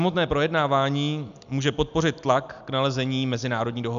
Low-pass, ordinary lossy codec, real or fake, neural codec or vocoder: 7.2 kHz; MP3, 96 kbps; real; none